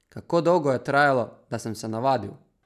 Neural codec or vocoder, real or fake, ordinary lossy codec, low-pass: none; real; none; 14.4 kHz